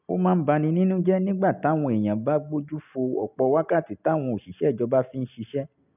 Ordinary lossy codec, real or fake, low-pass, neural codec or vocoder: none; real; 3.6 kHz; none